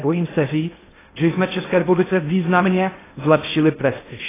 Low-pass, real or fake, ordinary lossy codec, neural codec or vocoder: 3.6 kHz; fake; AAC, 16 kbps; codec, 16 kHz in and 24 kHz out, 0.6 kbps, FocalCodec, streaming, 2048 codes